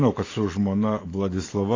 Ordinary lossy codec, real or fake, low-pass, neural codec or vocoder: AAC, 32 kbps; fake; 7.2 kHz; vocoder, 44.1 kHz, 128 mel bands every 512 samples, BigVGAN v2